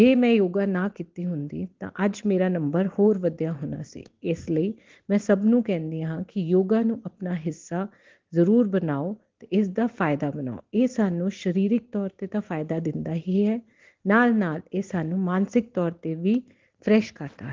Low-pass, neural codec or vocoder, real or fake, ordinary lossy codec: 7.2 kHz; none; real; Opus, 16 kbps